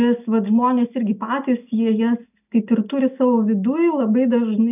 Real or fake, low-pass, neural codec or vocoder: real; 3.6 kHz; none